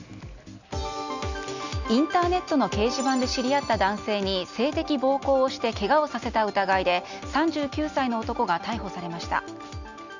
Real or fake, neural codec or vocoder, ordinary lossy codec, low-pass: real; none; none; 7.2 kHz